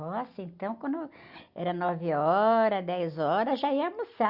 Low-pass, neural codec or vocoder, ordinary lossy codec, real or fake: 5.4 kHz; none; Opus, 64 kbps; real